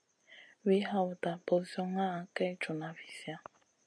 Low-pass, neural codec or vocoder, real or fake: 9.9 kHz; none; real